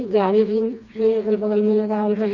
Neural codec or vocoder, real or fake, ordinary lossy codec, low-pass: codec, 16 kHz, 2 kbps, FreqCodec, smaller model; fake; none; 7.2 kHz